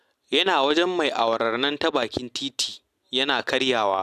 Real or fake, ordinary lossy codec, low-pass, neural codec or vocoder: real; AAC, 96 kbps; 14.4 kHz; none